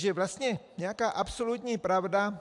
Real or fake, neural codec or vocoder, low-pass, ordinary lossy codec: real; none; 10.8 kHz; AAC, 64 kbps